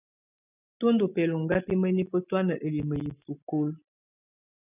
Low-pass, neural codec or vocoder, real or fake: 3.6 kHz; none; real